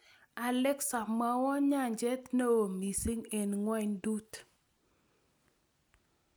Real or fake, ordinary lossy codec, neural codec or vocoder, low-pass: real; none; none; none